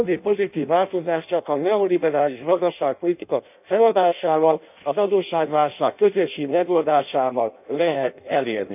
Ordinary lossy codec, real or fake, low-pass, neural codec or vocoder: none; fake; 3.6 kHz; codec, 16 kHz in and 24 kHz out, 0.6 kbps, FireRedTTS-2 codec